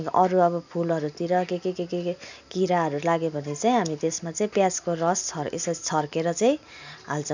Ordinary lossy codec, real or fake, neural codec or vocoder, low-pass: none; real; none; 7.2 kHz